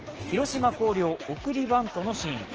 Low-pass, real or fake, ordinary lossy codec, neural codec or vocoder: 7.2 kHz; fake; Opus, 16 kbps; codec, 16 kHz, 6 kbps, DAC